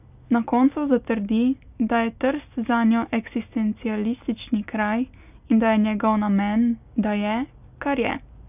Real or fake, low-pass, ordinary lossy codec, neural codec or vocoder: real; 3.6 kHz; none; none